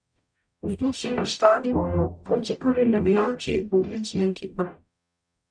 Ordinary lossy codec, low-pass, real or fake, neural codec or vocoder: none; 9.9 kHz; fake; codec, 44.1 kHz, 0.9 kbps, DAC